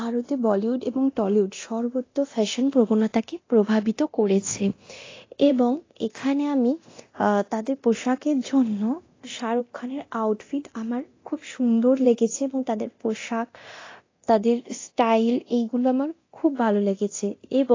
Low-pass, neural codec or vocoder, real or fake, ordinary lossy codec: 7.2 kHz; codec, 24 kHz, 0.9 kbps, DualCodec; fake; AAC, 32 kbps